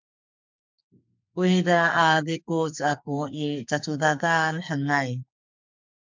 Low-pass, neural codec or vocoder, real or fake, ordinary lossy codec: 7.2 kHz; codec, 44.1 kHz, 2.6 kbps, SNAC; fake; MP3, 64 kbps